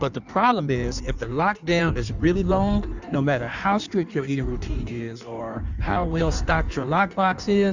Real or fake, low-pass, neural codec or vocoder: fake; 7.2 kHz; codec, 16 kHz in and 24 kHz out, 1.1 kbps, FireRedTTS-2 codec